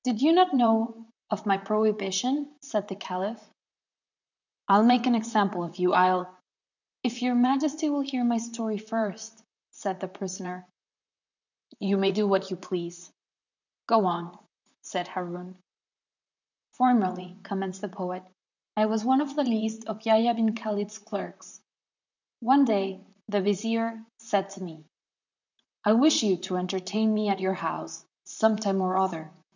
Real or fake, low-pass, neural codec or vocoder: fake; 7.2 kHz; vocoder, 44.1 kHz, 128 mel bands, Pupu-Vocoder